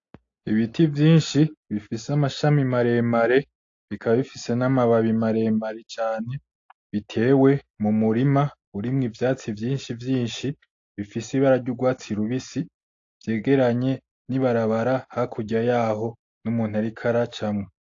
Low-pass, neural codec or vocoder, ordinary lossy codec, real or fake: 7.2 kHz; none; AAC, 48 kbps; real